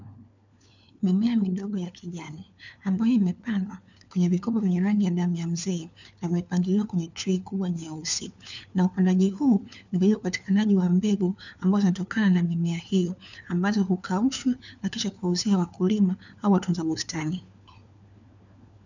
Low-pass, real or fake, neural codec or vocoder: 7.2 kHz; fake; codec, 16 kHz, 4 kbps, FunCodec, trained on LibriTTS, 50 frames a second